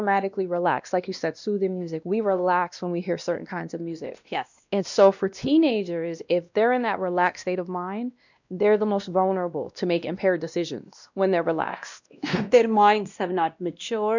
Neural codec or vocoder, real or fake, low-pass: codec, 16 kHz, 1 kbps, X-Codec, WavLM features, trained on Multilingual LibriSpeech; fake; 7.2 kHz